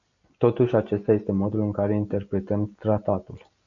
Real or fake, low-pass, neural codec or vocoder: real; 7.2 kHz; none